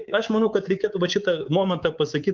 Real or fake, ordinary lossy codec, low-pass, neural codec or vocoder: fake; Opus, 24 kbps; 7.2 kHz; codec, 24 kHz, 3.1 kbps, DualCodec